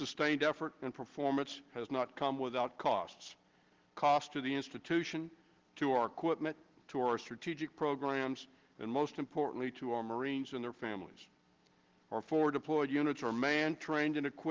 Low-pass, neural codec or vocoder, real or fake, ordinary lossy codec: 7.2 kHz; none; real; Opus, 16 kbps